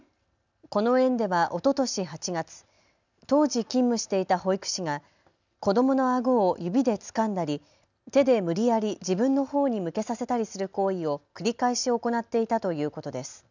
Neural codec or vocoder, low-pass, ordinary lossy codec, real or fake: none; 7.2 kHz; none; real